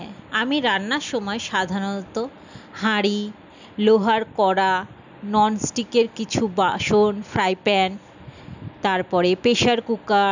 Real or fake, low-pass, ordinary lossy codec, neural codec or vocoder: real; 7.2 kHz; none; none